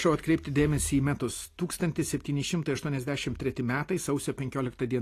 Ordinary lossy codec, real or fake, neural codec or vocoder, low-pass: AAC, 48 kbps; real; none; 14.4 kHz